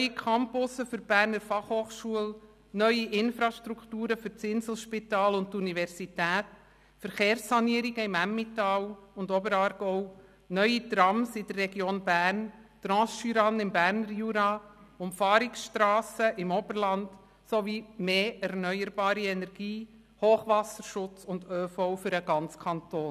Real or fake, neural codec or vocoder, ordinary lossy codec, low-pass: real; none; none; 14.4 kHz